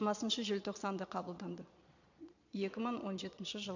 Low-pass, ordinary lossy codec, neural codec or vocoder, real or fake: 7.2 kHz; none; vocoder, 22.05 kHz, 80 mel bands, Vocos; fake